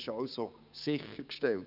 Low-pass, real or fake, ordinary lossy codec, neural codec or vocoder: 5.4 kHz; fake; none; codec, 16 kHz, 6 kbps, DAC